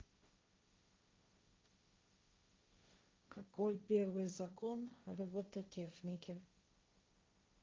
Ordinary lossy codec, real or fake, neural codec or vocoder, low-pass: Opus, 24 kbps; fake; codec, 16 kHz, 1.1 kbps, Voila-Tokenizer; 7.2 kHz